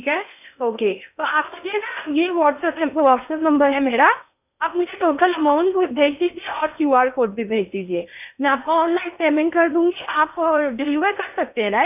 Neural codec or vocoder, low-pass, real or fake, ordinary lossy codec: codec, 16 kHz in and 24 kHz out, 0.8 kbps, FocalCodec, streaming, 65536 codes; 3.6 kHz; fake; none